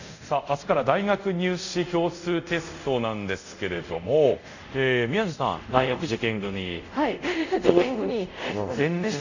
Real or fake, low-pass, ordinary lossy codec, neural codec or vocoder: fake; 7.2 kHz; none; codec, 24 kHz, 0.5 kbps, DualCodec